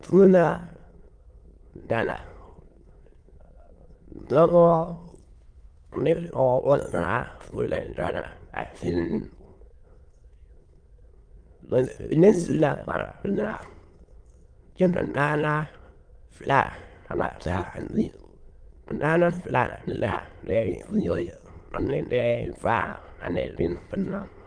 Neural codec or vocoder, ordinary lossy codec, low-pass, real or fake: autoencoder, 22.05 kHz, a latent of 192 numbers a frame, VITS, trained on many speakers; Opus, 24 kbps; 9.9 kHz; fake